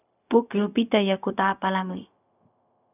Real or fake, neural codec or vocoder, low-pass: fake; codec, 16 kHz, 0.4 kbps, LongCat-Audio-Codec; 3.6 kHz